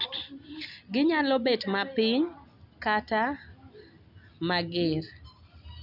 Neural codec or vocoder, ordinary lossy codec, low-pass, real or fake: none; none; 5.4 kHz; real